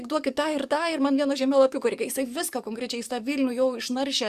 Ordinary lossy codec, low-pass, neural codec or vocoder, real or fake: Opus, 64 kbps; 14.4 kHz; codec, 44.1 kHz, 7.8 kbps, DAC; fake